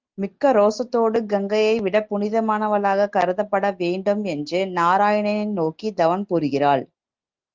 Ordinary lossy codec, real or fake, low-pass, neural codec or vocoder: Opus, 32 kbps; real; 7.2 kHz; none